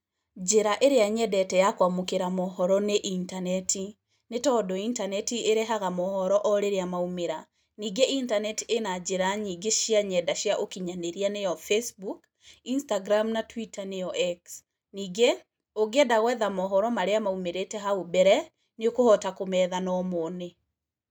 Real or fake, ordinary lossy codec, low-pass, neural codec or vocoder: real; none; none; none